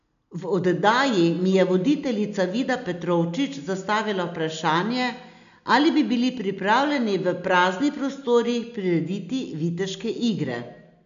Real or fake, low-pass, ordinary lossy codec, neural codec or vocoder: real; 7.2 kHz; none; none